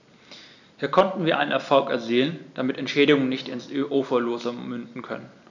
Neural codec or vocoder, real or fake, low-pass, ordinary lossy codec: none; real; 7.2 kHz; none